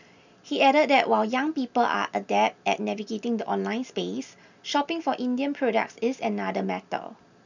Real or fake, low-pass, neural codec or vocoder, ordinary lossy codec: real; 7.2 kHz; none; none